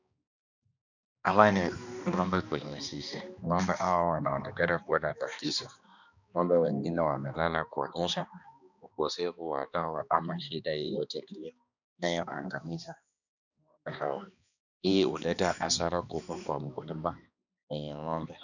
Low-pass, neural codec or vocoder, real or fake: 7.2 kHz; codec, 16 kHz, 2 kbps, X-Codec, HuBERT features, trained on balanced general audio; fake